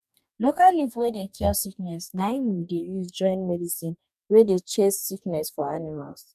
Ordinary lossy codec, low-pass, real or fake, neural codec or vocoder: none; 14.4 kHz; fake; codec, 44.1 kHz, 2.6 kbps, DAC